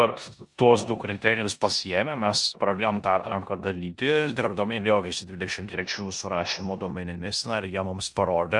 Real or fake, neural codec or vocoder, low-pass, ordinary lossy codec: fake; codec, 16 kHz in and 24 kHz out, 0.9 kbps, LongCat-Audio-Codec, four codebook decoder; 10.8 kHz; Opus, 64 kbps